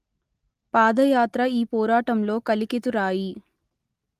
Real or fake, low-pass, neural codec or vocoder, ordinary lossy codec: real; 14.4 kHz; none; Opus, 24 kbps